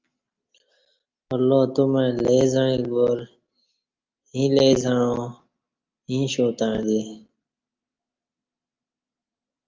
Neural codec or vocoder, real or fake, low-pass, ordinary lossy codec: none; real; 7.2 kHz; Opus, 24 kbps